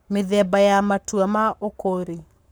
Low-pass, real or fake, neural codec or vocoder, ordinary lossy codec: none; fake; codec, 44.1 kHz, 7.8 kbps, Pupu-Codec; none